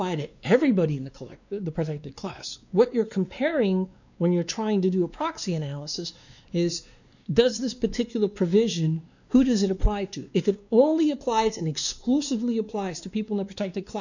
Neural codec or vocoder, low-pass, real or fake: codec, 16 kHz, 2 kbps, X-Codec, WavLM features, trained on Multilingual LibriSpeech; 7.2 kHz; fake